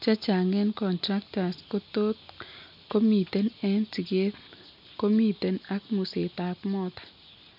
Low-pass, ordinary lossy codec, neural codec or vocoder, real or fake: 5.4 kHz; MP3, 48 kbps; none; real